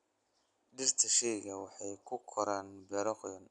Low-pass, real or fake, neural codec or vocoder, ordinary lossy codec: 10.8 kHz; real; none; none